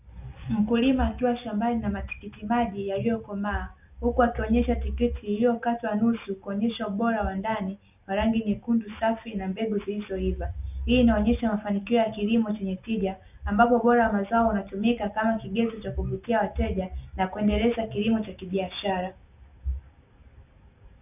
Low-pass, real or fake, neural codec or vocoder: 3.6 kHz; real; none